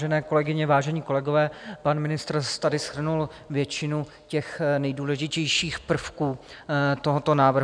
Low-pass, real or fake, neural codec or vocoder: 9.9 kHz; real; none